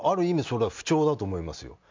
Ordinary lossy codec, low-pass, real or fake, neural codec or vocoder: MP3, 64 kbps; 7.2 kHz; real; none